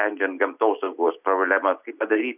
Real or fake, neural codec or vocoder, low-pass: real; none; 3.6 kHz